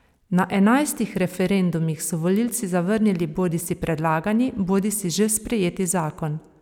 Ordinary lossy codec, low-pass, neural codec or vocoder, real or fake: none; 19.8 kHz; none; real